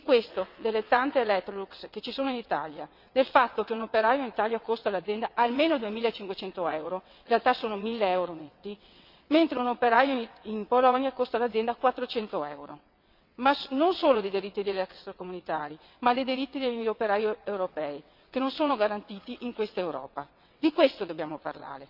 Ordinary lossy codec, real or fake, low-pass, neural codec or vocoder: MP3, 48 kbps; fake; 5.4 kHz; vocoder, 22.05 kHz, 80 mel bands, WaveNeXt